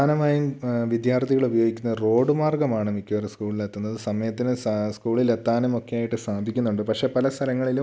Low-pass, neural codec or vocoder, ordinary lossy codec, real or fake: none; none; none; real